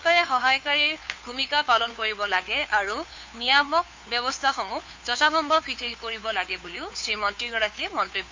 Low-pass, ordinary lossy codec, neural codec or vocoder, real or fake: 7.2 kHz; MP3, 48 kbps; codec, 16 kHz, 2 kbps, FunCodec, trained on Chinese and English, 25 frames a second; fake